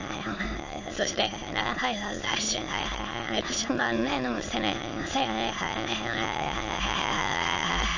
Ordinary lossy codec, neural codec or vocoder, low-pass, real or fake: AAC, 48 kbps; autoencoder, 22.05 kHz, a latent of 192 numbers a frame, VITS, trained on many speakers; 7.2 kHz; fake